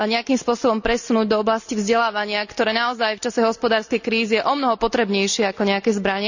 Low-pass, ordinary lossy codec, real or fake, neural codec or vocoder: 7.2 kHz; none; real; none